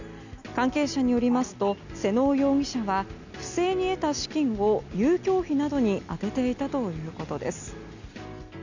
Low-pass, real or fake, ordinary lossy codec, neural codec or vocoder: 7.2 kHz; real; none; none